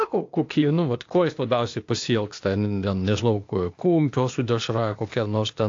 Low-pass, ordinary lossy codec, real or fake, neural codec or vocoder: 7.2 kHz; AAC, 48 kbps; fake; codec, 16 kHz, 0.8 kbps, ZipCodec